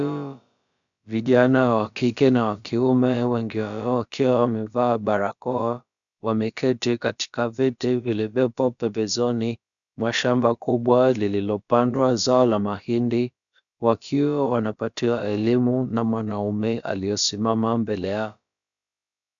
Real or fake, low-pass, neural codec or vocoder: fake; 7.2 kHz; codec, 16 kHz, about 1 kbps, DyCAST, with the encoder's durations